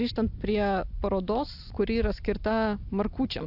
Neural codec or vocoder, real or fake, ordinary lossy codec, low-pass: none; real; AAC, 48 kbps; 5.4 kHz